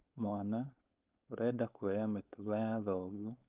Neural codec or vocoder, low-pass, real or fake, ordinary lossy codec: codec, 16 kHz, 4.8 kbps, FACodec; 3.6 kHz; fake; Opus, 32 kbps